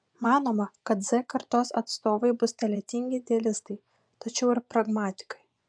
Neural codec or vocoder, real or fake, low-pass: vocoder, 24 kHz, 100 mel bands, Vocos; fake; 9.9 kHz